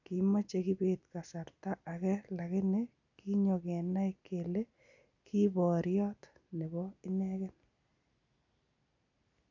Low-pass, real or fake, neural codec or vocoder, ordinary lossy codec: 7.2 kHz; real; none; none